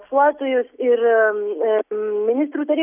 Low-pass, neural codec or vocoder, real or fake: 3.6 kHz; none; real